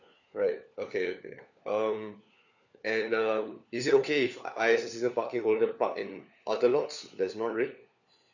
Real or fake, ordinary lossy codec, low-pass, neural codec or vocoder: fake; none; 7.2 kHz; codec, 16 kHz, 4 kbps, FunCodec, trained on LibriTTS, 50 frames a second